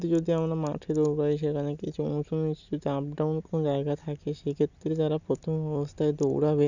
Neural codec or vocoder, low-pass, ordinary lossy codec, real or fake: none; 7.2 kHz; none; real